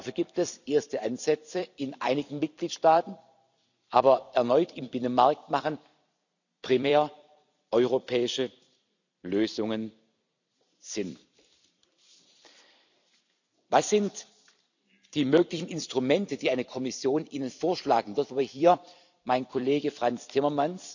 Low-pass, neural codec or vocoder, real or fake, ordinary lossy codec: 7.2 kHz; vocoder, 44.1 kHz, 128 mel bands every 256 samples, BigVGAN v2; fake; none